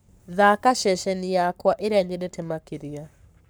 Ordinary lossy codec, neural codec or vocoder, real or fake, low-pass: none; codec, 44.1 kHz, 7.8 kbps, Pupu-Codec; fake; none